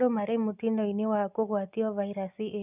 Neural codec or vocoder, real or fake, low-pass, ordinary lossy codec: none; real; 3.6 kHz; none